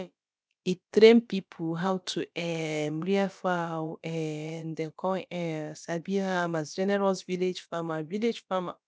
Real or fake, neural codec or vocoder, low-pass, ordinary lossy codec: fake; codec, 16 kHz, about 1 kbps, DyCAST, with the encoder's durations; none; none